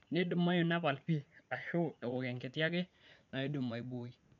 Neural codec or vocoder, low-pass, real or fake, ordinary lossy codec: vocoder, 44.1 kHz, 80 mel bands, Vocos; 7.2 kHz; fake; none